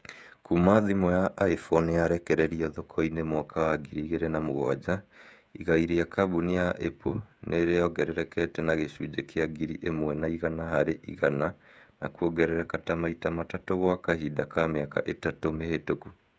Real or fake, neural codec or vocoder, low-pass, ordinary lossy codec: fake; codec, 16 kHz, 16 kbps, FreqCodec, smaller model; none; none